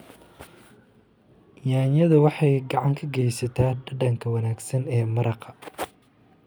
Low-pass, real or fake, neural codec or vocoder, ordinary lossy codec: none; real; none; none